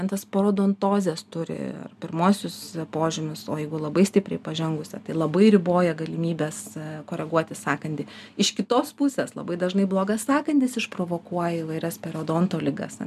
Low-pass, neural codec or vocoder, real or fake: 14.4 kHz; none; real